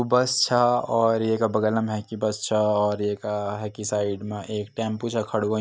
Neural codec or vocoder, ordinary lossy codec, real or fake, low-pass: none; none; real; none